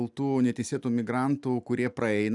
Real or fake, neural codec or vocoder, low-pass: real; none; 10.8 kHz